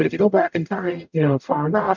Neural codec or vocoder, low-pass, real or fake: codec, 44.1 kHz, 0.9 kbps, DAC; 7.2 kHz; fake